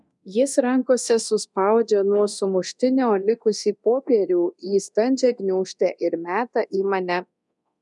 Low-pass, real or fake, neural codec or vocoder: 10.8 kHz; fake; codec, 24 kHz, 0.9 kbps, DualCodec